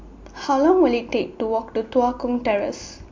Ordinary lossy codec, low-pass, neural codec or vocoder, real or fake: MP3, 48 kbps; 7.2 kHz; none; real